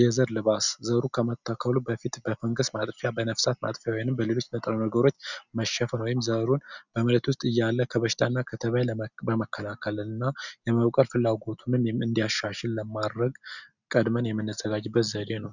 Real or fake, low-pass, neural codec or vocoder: real; 7.2 kHz; none